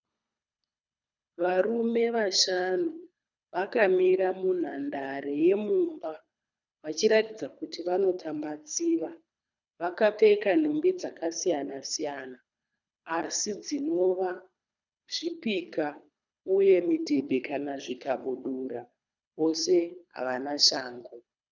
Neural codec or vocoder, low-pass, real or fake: codec, 24 kHz, 3 kbps, HILCodec; 7.2 kHz; fake